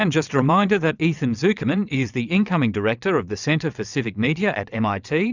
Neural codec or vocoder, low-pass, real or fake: vocoder, 22.05 kHz, 80 mel bands, WaveNeXt; 7.2 kHz; fake